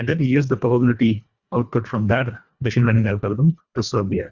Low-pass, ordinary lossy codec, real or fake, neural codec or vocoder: 7.2 kHz; Opus, 64 kbps; fake; codec, 24 kHz, 1.5 kbps, HILCodec